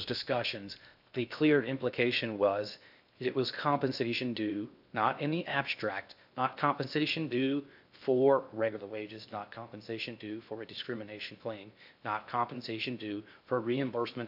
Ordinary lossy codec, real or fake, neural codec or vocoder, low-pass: AAC, 48 kbps; fake; codec, 16 kHz in and 24 kHz out, 0.6 kbps, FocalCodec, streaming, 2048 codes; 5.4 kHz